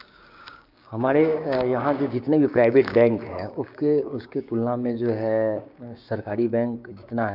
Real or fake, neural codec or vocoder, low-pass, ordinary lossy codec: fake; codec, 16 kHz, 6 kbps, DAC; 5.4 kHz; AAC, 48 kbps